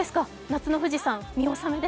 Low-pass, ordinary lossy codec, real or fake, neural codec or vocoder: none; none; real; none